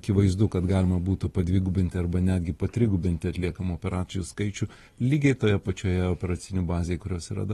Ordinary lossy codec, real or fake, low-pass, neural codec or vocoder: AAC, 32 kbps; real; 19.8 kHz; none